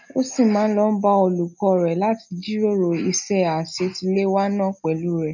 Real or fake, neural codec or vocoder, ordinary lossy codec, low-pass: real; none; none; 7.2 kHz